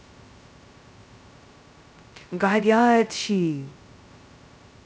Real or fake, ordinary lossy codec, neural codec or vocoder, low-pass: fake; none; codec, 16 kHz, 0.2 kbps, FocalCodec; none